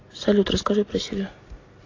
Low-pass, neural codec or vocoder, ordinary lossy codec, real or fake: 7.2 kHz; none; AAC, 32 kbps; real